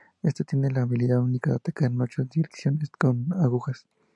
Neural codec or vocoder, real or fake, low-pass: none; real; 10.8 kHz